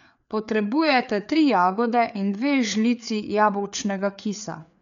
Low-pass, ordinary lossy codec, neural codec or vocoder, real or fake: 7.2 kHz; none; codec, 16 kHz, 4 kbps, FreqCodec, larger model; fake